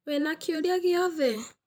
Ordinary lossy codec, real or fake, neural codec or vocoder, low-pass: none; fake; vocoder, 44.1 kHz, 128 mel bands every 512 samples, BigVGAN v2; none